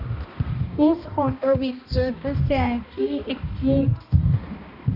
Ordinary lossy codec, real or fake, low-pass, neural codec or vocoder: none; fake; 5.4 kHz; codec, 16 kHz, 1 kbps, X-Codec, HuBERT features, trained on balanced general audio